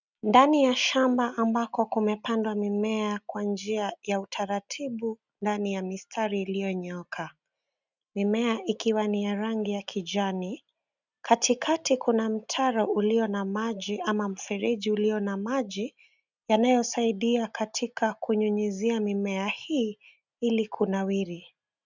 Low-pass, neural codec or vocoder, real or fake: 7.2 kHz; none; real